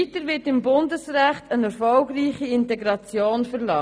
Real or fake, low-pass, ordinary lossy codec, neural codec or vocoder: real; none; none; none